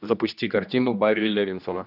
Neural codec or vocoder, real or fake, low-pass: codec, 16 kHz, 1 kbps, X-Codec, HuBERT features, trained on general audio; fake; 5.4 kHz